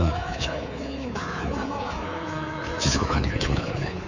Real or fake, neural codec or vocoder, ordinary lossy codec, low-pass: fake; codec, 24 kHz, 3.1 kbps, DualCodec; none; 7.2 kHz